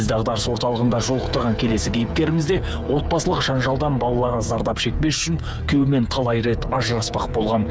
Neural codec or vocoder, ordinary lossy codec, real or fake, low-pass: codec, 16 kHz, 8 kbps, FreqCodec, smaller model; none; fake; none